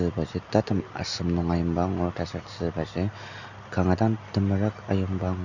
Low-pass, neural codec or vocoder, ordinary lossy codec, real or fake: 7.2 kHz; none; none; real